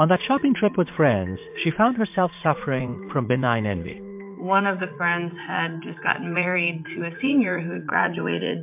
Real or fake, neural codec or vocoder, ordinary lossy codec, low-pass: fake; vocoder, 44.1 kHz, 80 mel bands, Vocos; MP3, 32 kbps; 3.6 kHz